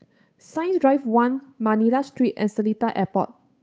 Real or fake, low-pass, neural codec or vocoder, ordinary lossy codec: fake; none; codec, 16 kHz, 8 kbps, FunCodec, trained on Chinese and English, 25 frames a second; none